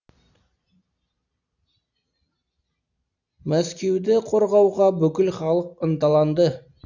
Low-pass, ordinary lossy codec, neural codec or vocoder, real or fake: 7.2 kHz; none; none; real